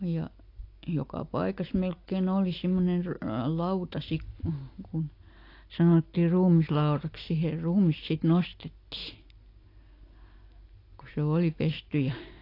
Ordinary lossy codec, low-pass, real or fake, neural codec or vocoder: AAC, 32 kbps; 5.4 kHz; real; none